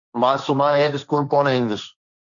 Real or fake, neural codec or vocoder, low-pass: fake; codec, 16 kHz, 1.1 kbps, Voila-Tokenizer; 7.2 kHz